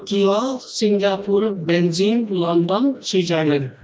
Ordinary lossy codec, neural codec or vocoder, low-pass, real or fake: none; codec, 16 kHz, 1 kbps, FreqCodec, smaller model; none; fake